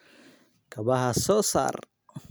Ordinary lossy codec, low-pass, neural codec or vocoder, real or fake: none; none; none; real